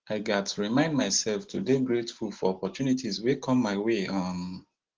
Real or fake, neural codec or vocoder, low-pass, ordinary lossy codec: real; none; 7.2 kHz; Opus, 16 kbps